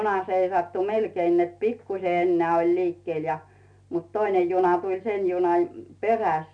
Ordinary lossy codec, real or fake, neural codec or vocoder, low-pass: none; real; none; 9.9 kHz